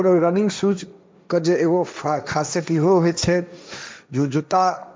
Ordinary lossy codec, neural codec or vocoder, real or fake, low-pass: none; codec, 16 kHz, 1.1 kbps, Voila-Tokenizer; fake; none